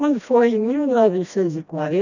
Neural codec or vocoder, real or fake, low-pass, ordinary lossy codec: codec, 16 kHz, 1 kbps, FreqCodec, smaller model; fake; 7.2 kHz; none